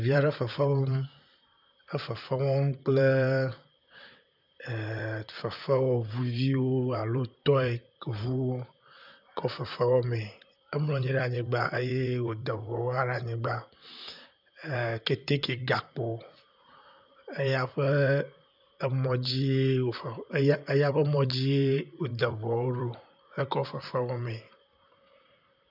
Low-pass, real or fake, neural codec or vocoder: 5.4 kHz; fake; vocoder, 44.1 kHz, 128 mel bands, Pupu-Vocoder